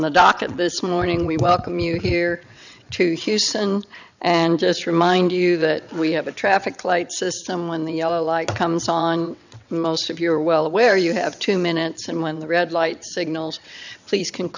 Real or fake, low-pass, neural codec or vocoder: fake; 7.2 kHz; vocoder, 22.05 kHz, 80 mel bands, WaveNeXt